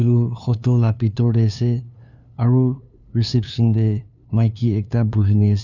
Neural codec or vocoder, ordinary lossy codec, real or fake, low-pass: codec, 16 kHz, 2 kbps, FunCodec, trained on LibriTTS, 25 frames a second; none; fake; 7.2 kHz